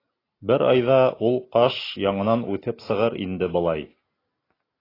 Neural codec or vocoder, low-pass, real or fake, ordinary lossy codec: none; 5.4 kHz; real; AAC, 24 kbps